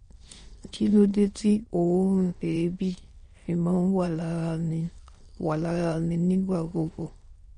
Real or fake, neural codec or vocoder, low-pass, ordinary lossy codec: fake; autoencoder, 22.05 kHz, a latent of 192 numbers a frame, VITS, trained on many speakers; 9.9 kHz; MP3, 48 kbps